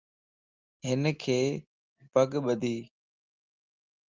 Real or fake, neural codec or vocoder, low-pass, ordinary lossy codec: real; none; 7.2 kHz; Opus, 32 kbps